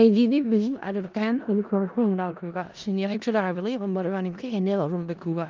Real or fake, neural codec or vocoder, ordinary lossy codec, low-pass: fake; codec, 16 kHz in and 24 kHz out, 0.4 kbps, LongCat-Audio-Codec, four codebook decoder; Opus, 24 kbps; 7.2 kHz